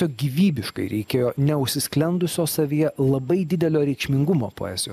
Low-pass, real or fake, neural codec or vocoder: 14.4 kHz; real; none